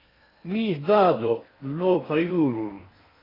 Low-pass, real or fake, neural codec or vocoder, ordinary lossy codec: 5.4 kHz; fake; codec, 16 kHz in and 24 kHz out, 0.6 kbps, FocalCodec, streaming, 4096 codes; AAC, 24 kbps